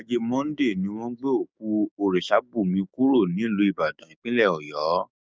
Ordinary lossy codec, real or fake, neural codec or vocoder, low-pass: none; fake; codec, 16 kHz, 6 kbps, DAC; none